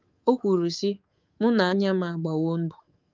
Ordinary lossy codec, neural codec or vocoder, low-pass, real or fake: Opus, 32 kbps; codec, 24 kHz, 3.1 kbps, DualCodec; 7.2 kHz; fake